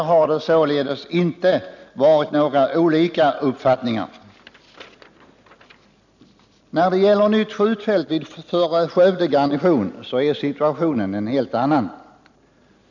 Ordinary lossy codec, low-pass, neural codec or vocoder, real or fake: none; 7.2 kHz; none; real